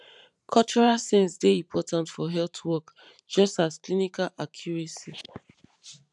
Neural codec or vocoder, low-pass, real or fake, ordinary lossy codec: vocoder, 44.1 kHz, 128 mel bands every 512 samples, BigVGAN v2; 10.8 kHz; fake; none